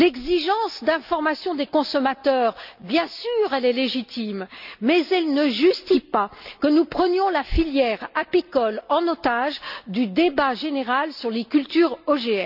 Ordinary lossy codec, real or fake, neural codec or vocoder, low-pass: none; real; none; 5.4 kHz